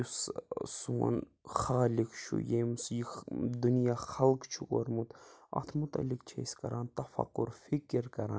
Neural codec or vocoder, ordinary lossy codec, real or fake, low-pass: none; none; real; none